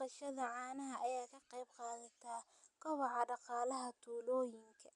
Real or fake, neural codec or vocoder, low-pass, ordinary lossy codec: real; none; 10.8 kHz; none